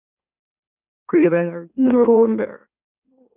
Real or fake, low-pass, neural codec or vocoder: fake; 3.6 kHz; autoencoder, 44.1 kHz, a latent of 192 numbers a frame, MeloTTS